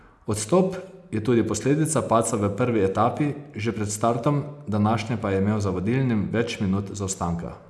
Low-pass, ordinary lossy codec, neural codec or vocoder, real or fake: none; none; none; real